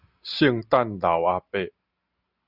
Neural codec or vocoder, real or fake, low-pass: none; real; 5.4 kHz